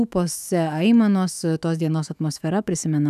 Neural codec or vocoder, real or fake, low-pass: autoencoder, 48 kHz, 128 numbers a frame, DAC-VAE, trained on Japanese speech; fake; 14.4 kHz